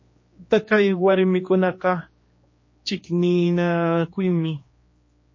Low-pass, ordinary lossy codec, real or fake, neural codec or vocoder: 7.2 kHz; MP3, 32 kbps; fake; codec, 16 kHz, 2 kbps, X-Codec, HuBERT features, trained on general audio